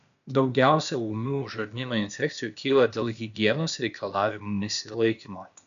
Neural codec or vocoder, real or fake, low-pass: codec, 16 kHz, 0.8 kbps, ZipCodec; fake; 7.2 kHz